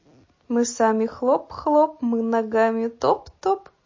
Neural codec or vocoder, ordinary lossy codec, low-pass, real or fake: none; MP3, 32 kbps; 7.2 kHz; real